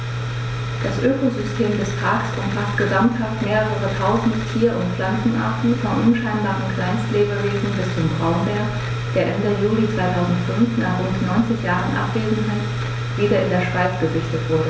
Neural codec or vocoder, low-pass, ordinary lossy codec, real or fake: none; none; none; real